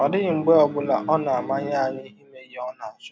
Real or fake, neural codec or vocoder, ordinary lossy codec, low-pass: real; none; none; none